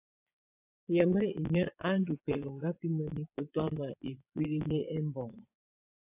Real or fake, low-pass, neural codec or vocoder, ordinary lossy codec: fake; 3.6 kHz; vocoder, 22.05 kHz, 80 mel bands, Vocos; AAC, 32 kbps